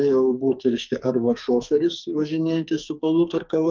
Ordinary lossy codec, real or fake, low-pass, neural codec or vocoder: Opus, 24 kbps; fake; 7.2 kHz; codec, 44.1 kHz, 2.6 kbps, SNAC